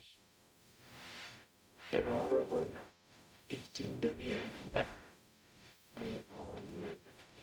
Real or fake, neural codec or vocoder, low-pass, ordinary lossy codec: fake; codec, 44.1 kHz, 0.9 kbps, DAC; 19.8 kHz; none